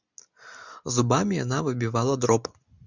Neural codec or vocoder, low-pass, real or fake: none; 7.2 kHz; real